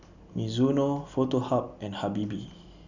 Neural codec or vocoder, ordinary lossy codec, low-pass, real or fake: none; none; 7.2 kHz; real